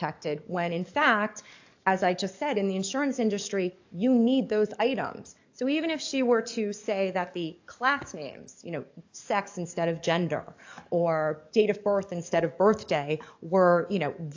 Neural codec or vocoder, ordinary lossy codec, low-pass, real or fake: codec, 44.1 kHz, 7.8 kbps, DAC; AAC, 48 kbps; 7.2 kHz; fake